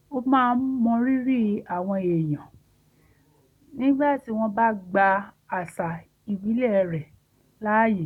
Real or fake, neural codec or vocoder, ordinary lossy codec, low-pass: real; none; none; 19.8 kHz